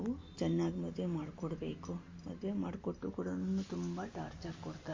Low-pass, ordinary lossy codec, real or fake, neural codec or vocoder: 7.2 kHz; MP3, 32 kbps; real; none